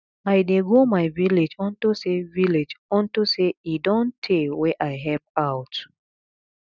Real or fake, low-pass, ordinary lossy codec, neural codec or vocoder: real; 7.2 kHz; none; none